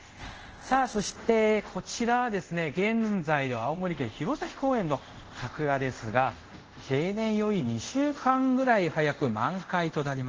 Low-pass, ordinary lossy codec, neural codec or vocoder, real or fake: 7.2 kHz; Opus, 16 kbps; codec, 24 kHz, 0.5 kbps, DualCodec; fake